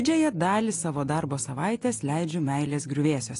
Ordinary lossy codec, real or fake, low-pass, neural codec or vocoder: AAC, 48 kbps; real; 10.8 kHz; none